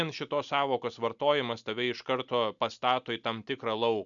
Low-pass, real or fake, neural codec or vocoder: 7.2 kHz; real; none